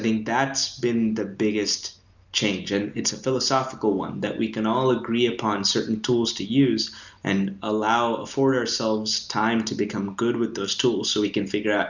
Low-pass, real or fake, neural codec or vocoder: 7.2 kHz; real; none